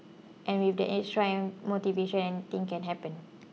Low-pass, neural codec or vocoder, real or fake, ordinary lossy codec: none; none; real; none